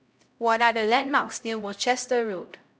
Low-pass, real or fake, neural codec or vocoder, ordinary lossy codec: none; fake; codec, 16 kHz, 0.5 kbps, X-Codec, HuBERT features, trained on LibriSpeech; none